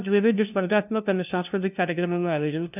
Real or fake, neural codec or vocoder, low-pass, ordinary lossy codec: fake; codec, 16 kHz, 0.5 kbps, FunCodec, trained on LibriTTS, 25 frames a second; 3.6 kHz; none